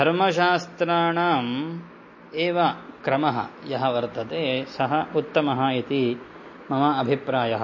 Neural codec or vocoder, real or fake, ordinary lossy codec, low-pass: none; real; MP3, 32 kbps; 7.2 kHz